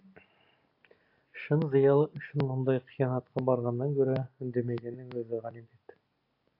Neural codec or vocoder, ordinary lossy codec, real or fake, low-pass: none; AAC, 32 kbps; real; 5.4 kHz